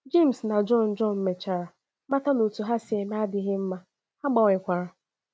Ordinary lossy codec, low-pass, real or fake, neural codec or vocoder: none; none; real; none